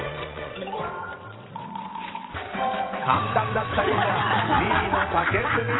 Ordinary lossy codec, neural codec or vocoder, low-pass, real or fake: AAC, 16 kbps; vocoder, 22.05 kHz, 80 mel bands, WaveNeXt; 7.2 kHz; fake